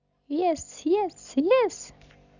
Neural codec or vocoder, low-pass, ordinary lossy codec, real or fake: none; 7.2 kHz; none; real